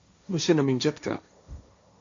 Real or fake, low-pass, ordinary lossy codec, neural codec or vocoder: fake; 7.2 kHz; AAC, 64 kbps; codec, 16 kHz, 1.1 kbps, Voila-Tokenizer